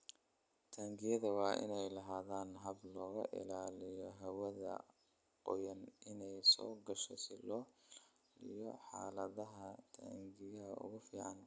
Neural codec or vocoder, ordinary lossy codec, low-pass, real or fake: none; none; none; real